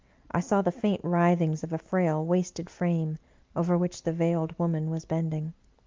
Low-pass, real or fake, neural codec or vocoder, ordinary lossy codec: 7.2 kHz; real; none; Opus, 32 kbps